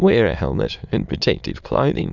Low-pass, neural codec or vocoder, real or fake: 7.2 kHz; autoencoder, 22.05 kHz, a latent of 192 numbers a frame, VITS, trained on many speakers; fake